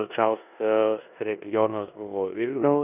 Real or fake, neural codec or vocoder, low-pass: fake; codec, 16 kHz in and 24 kHz out, 0.9 kbps, LongCat-Audio-Codec, four codebook decoder; 3.6 kHz